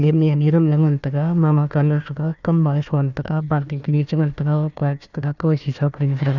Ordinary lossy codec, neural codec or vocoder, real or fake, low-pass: none; codec, 16 kHz, 1 kbps, FunCodec, trained on Chinese and English, 50 frames a second; fake; 7.2 kHz